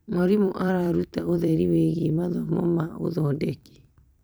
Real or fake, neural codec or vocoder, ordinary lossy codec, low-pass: fake; vocoder, 44.1 kHz, 128 mel bands every 256 samples, BigVGAN v2; none; none